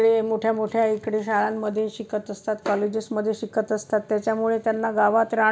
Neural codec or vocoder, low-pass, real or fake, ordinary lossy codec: none; none; real; none